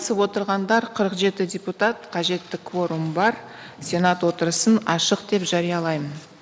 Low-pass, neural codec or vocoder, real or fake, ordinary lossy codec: none; none; real; none